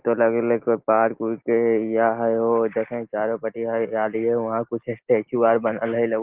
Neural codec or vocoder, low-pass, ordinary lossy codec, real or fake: none; 3.6 kHz; Opus, 16 kbps; real